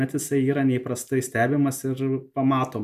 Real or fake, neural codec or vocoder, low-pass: real; none; 14.4 kHz